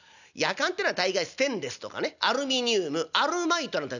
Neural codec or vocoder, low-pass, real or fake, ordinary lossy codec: none; 7.2 kHz; real; none